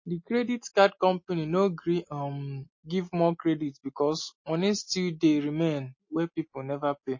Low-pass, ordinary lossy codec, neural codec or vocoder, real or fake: 7.2 kHz; MP3, 32 kbps; none; real